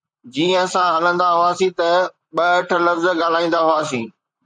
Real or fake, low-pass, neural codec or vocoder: fake; 9.9 kHz; vocoder, 44.1 kHz, 128 mel bands, Pupu-Vocoder